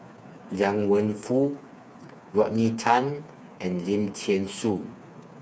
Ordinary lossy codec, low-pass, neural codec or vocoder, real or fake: none; none; codec, 16 kHz, 4 kbps, FreqCodec, smaller model; fake